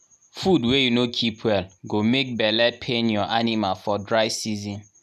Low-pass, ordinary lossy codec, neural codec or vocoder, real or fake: 14.4 kHz; none; none; real